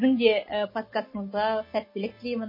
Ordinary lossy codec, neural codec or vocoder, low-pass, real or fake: MP3, 24 kbps; none; 5.4 kHz; real